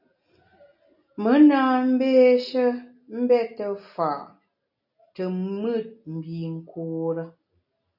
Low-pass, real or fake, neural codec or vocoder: 5.4 kHz; real; none